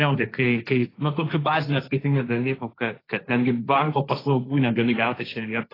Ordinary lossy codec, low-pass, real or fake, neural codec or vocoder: AAC, 24 kbps; 5.4 kHz; fake; codec, 16 kHz, 1.1 kbps, Voila-Tokenizer